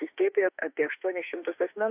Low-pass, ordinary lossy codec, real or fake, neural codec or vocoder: 3.6 kHz; AAC, 32 kbps; fake; autoencoder, 48 kHz, 32 numbers a frame, DAC-VAE, trained on Japanese speech